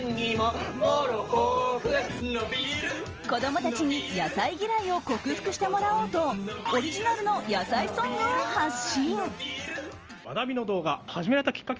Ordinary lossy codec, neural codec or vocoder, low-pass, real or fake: Opus, 24 kbps; none; 7.2 kHz; real